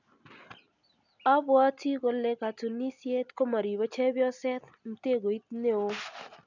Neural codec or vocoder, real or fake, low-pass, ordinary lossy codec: none; real; 7.2 kHz; none